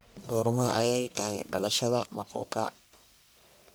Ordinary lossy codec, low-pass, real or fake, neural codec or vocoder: none; none; fake; codec, 44.1 kHz, 1.7 kbps, Pupu-Codec